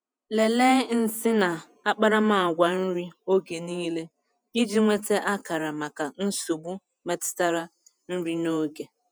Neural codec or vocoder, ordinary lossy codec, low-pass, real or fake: vocoder, 48 kHz, 128 mel bands, Vocos; none; none; fake